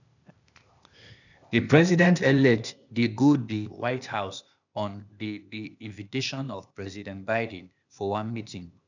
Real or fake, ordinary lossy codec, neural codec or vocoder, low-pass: fake; none; codec, 16 kHz, 0.8 kbps, ZipCodec; 7.2 kHz